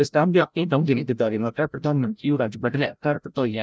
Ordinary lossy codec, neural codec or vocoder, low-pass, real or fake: none; codec, 16 kHz, 0.5 kbps, FreqCodec, larger model; none; fake